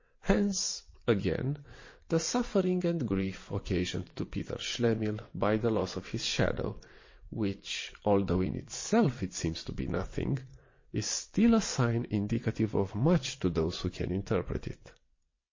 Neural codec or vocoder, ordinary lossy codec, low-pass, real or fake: vocoder, 22.05 kHz, 80 mel bands, WaveNeXt; MP3, 32 kbps; 7.2 kHz; fake